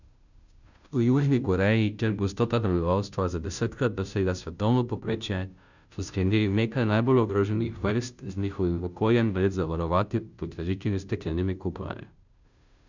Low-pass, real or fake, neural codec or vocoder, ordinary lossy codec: 7.2 kHz; fake; codec, 16 kHz, 0.5 kbps, FunCodec, trained on Chinese and English, 25 frames a second; none